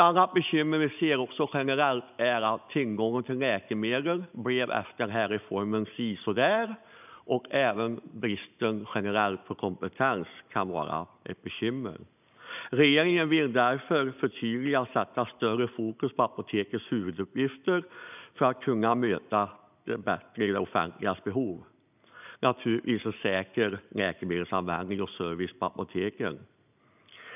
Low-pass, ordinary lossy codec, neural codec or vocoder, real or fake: 3.6 kHz; none; none; real